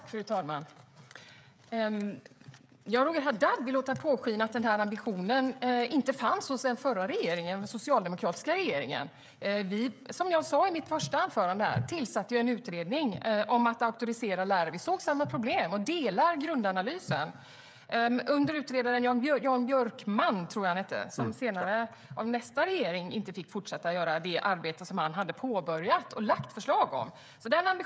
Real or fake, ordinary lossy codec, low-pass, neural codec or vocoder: fake; none; none; codec, 16 kHz, 16 kbps, FreqCodec, smaller model